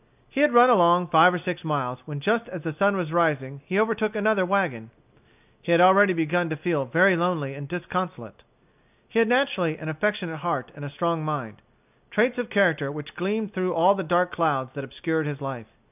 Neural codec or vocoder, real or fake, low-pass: none; real; 3.6 kHz